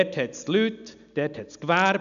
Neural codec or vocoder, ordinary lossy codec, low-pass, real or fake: none; none; 7.2 kHz; real